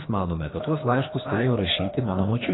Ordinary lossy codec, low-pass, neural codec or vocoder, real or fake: AAC, 16 kbps; 7.2 kHz; codec, 24 kHz, 3 kbps, HILCodec; fake